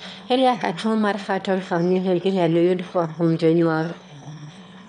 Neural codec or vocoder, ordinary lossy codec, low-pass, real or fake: autoencoder, 22.05 kHz, a latent of 192 numbers a frame, VITS, trained on one speaker; none; 9.9 kHz; fake